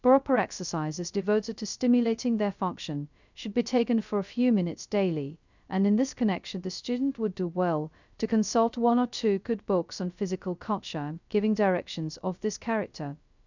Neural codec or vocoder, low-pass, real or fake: codec, 16 kHz, 0.2 kbps, FocalCodec; 7.2 kHz; fake